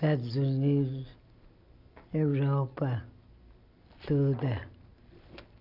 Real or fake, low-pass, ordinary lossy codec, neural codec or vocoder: real; 5.4 kHz; none; none